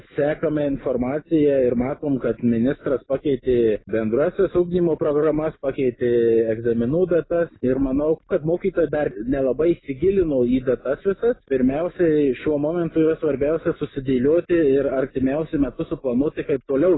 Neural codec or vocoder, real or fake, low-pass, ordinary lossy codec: none; real; 7.2 kHz; AAC, 16 kbps